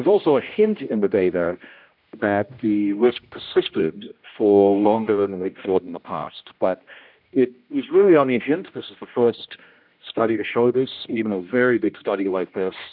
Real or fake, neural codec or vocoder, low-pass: fake; codec, 16 kHz, 1 kbps, X-Codec, HuBERT features, trained on general audio; 5.4 kHz